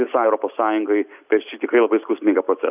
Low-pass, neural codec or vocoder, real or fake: 3.6 kHz; none; real